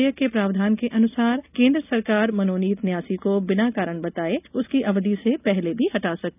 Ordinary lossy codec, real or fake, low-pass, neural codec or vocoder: none; real; 3.6 kHz; none